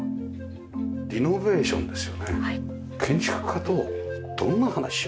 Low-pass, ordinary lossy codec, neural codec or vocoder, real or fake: none; none; none; real